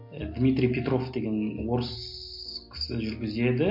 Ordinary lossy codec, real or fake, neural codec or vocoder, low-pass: MP3, 32 kbps; real; none; 5.4 kHz